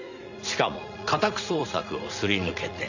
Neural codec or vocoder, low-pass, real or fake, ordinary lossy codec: vocoder, 44.1 kHz, 80 mel bands, Vocos; 7.2 kHz; fake; none